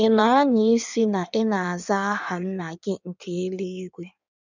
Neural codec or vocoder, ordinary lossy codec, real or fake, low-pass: codec, 16 kHz in and 24 kHz out, 1.1 kbps, FireRedTTS-2 codec; none; fake; 7.2 kHz